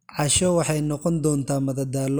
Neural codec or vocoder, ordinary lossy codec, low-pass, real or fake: none; none; none; real